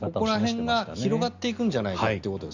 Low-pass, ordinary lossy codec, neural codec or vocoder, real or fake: 7.2 kHz; Opus, 64 kbps; none; real